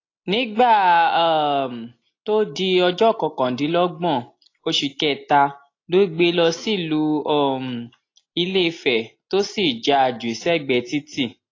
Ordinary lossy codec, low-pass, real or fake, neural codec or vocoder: AAC, 32 kbps; 7.2 kHz; real; none